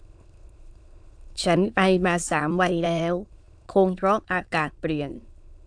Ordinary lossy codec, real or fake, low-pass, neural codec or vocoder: AAC, 64 kbps; fake; 9.9 kHz; autoencoder, 22.05 kHz, a latent of 192 numbers a frame, VITS, trained on many speakers